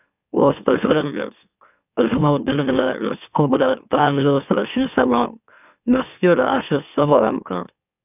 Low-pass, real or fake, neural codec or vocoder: 3.6 kHz; fake; autoencoder, 44.1 kHz, a latent of 192 numbers a frame, MeloTTS